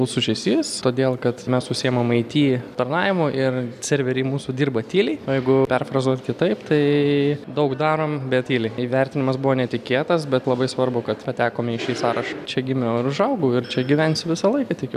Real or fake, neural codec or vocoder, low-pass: real; none; 14.4 kHz